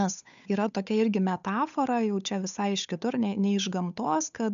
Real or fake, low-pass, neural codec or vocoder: fake; 7.2 kHz; codec, 16 kHz, 8 kbps, FunCodec, trained on Chinese and English, 25 frames a second